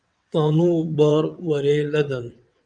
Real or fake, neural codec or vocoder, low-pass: fake; vocoder, 22.05 kHz, 80 mel bands, WaveNeXt; 9.9 kHz